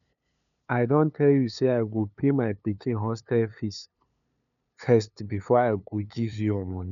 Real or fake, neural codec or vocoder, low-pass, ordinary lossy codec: fake; codec, 16 kHz, 2 kbps, FunCodec, trained on LibriTTS, 25 frames a second; 7.2 kHz; none